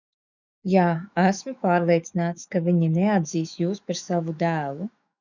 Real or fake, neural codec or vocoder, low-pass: fake; autoencoder, 48 kHz, 128 numbers a frame, DAC-VAE, trained on Japanese speech; 7.2 kHz